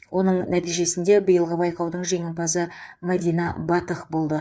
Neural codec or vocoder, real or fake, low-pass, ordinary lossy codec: codec, 16 kHz, 4 kbps, FreqCodec, larger model; fake; none; none